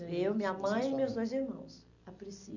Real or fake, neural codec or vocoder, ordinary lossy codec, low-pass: real; none; none; 7.2 kHz